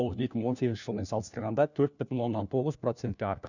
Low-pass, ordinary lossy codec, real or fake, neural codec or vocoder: 7.2 kHz; none; fake; codec, 16 kHz, 1 kbps, FunCodec, trained on LibriTTS, 50 frames a second